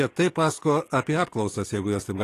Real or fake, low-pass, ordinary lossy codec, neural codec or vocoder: fake; 14.4 kHz; AAC, 48 kbps; codec, 44.1 kHz, 7.8 kbps, DAC